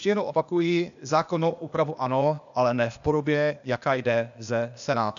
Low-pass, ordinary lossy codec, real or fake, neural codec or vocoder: 7.2 kHz; MP3, 96 kbps; fake; codec, 16 kHz, 0.8 kbps, ZipCodec